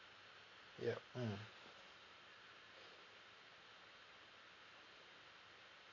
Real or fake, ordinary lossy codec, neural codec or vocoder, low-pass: real; none; none; 7.2 kHz